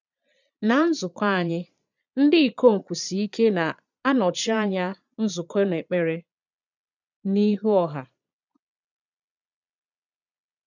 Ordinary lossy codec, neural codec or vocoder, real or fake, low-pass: none; vocoder, 22.05 kHz, 80 mel bands, Vocos; fake; 7.2 kHz